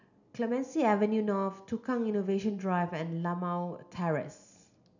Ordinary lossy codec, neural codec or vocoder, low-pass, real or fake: none; none; 7.2 kHz; real